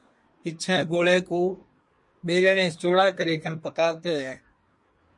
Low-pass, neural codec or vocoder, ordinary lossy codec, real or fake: 10.8 kHz; codec, 24 kHz, 1 kbps, SNAC; MP3, 48 kbps; fake